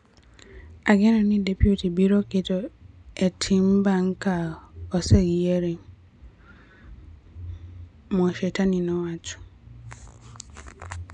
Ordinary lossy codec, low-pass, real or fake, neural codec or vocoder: none; 9.9 kHz; real; none